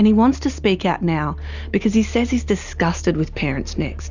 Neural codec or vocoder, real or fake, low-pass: none; real; 7.2 kHz